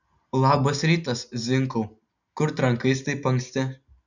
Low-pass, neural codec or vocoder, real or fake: 7.2 kHz; none; real